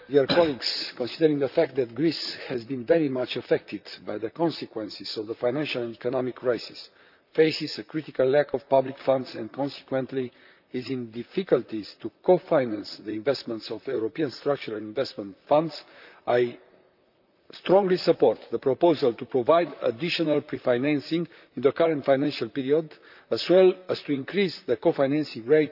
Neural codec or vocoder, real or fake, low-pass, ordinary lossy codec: vocoder, 44.1 kHz, 128 mel bands, Pupu-Vocoder; fake; 5.4 kHz; none